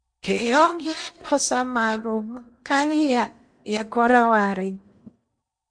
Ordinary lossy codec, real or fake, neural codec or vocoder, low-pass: MP3, 64 kbps; fake; codec, 16 kHz in and 24 kHz out, 0.8 kbps, FocalCodec, streaming, 65536 codes; 9.9 kHz